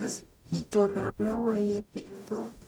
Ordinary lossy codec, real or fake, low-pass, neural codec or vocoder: none; fake; none; codec, 44.1 kHz, 0.9 kbps, DAC